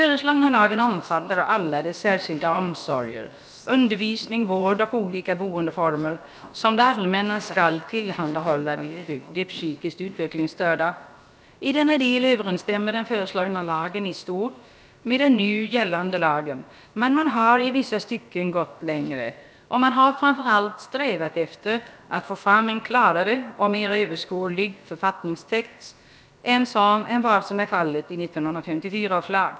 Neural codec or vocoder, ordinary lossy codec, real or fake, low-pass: codec, 16 kHz, about 1 kbps, DyCAST, with the encoder's durations; none; fake; none